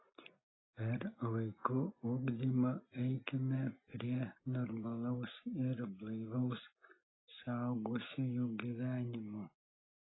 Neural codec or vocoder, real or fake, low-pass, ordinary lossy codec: none; real; 7.2 kHz; AAC, 16 kbps